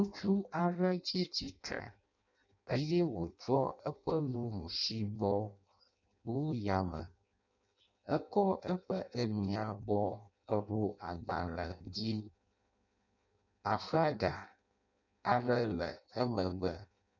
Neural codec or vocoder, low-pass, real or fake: codec, 16 kHz in and 24 kHz out, 0.6 kbps, FireRedTTS-2 codec; 7.2 kHz; fake